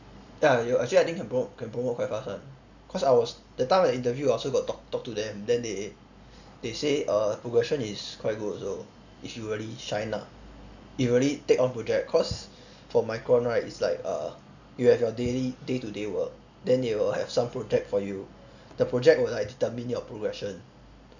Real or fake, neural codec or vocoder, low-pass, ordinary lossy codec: real; none; 7.2 kHz; none